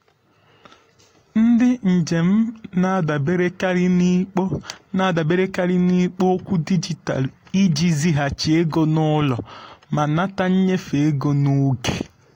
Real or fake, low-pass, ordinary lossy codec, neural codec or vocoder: real; 19.8 kHz; AAC, 48 kbps; none